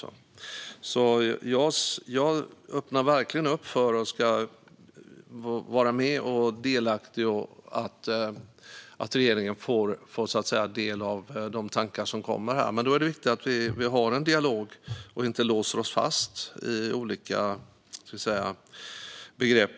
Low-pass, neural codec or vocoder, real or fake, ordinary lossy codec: none; none; real; none